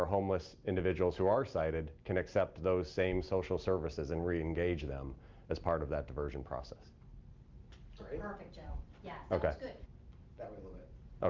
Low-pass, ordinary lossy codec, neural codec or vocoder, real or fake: 7.2 kHz; Opus, 24 kbps; none; real